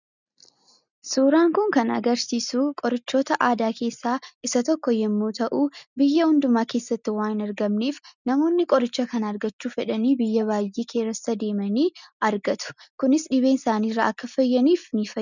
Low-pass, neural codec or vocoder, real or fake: 7.2 kHz; none; real